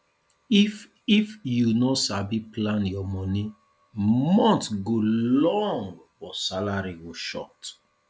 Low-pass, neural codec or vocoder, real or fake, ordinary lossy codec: none; none; real; none